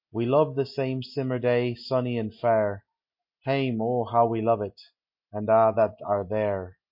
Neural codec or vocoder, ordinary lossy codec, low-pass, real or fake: none; MP3, 32 kbps; 5.4 kHz; real